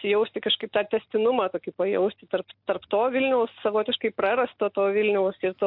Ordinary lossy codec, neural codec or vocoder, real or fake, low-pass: MP3, 48 kbps; none; real; 5.4 kHz